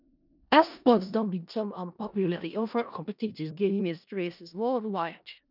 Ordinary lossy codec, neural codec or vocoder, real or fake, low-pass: none; codec, 16 kHz in and 24 kHz out, 0.4 kbps, LongCat-Audio-Codec, four codebook decoder; fake; 5.4 kHz